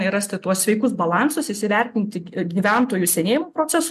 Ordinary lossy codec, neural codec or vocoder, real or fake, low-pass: MP3, 96 kbps; none; real; 14.4 kHz